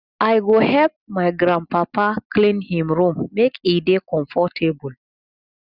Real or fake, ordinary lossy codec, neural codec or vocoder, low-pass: real; Opus, 64 kbps; none; 5.4 kHz